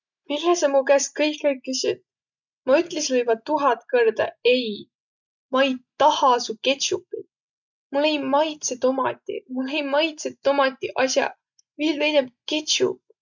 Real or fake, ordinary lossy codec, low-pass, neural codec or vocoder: real; none; 7.2 kHz; none